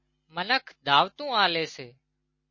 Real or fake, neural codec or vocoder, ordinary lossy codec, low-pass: real; none; MP3, 32 kbps; 7.2 kHz